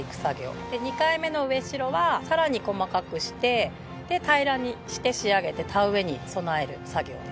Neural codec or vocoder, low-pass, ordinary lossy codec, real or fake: none; none; none; real